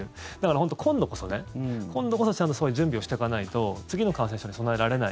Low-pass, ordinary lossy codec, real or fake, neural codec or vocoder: none; none; real; none